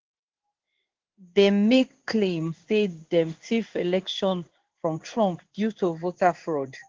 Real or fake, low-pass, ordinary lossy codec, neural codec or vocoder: fake; 7.2 kHz; Opus, 16 kbps; codec, 16 kHz in and 24 kHz out, 1 kbps, XY-Tokenizer